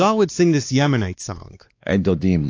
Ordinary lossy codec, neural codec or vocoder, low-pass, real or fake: AAC, 48 kbps; codec, 16 kHz, 2 kbps, X-Codec, WavLM features, trained on Multilingual LibriSpeech; 7.2 kHz; fake